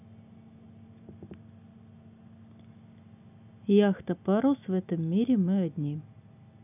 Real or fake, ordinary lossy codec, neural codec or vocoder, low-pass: real; none; none; 3.6 kHz